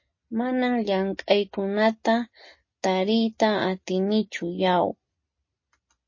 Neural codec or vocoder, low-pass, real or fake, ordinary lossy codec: none; 7.2 kHz; real; MP3, 32 kbps